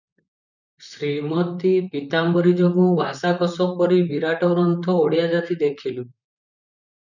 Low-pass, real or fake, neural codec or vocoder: 7.2 kHz; fake; vocoder, 44.1 kHz, 128 mel bands, Pupu-Vocoder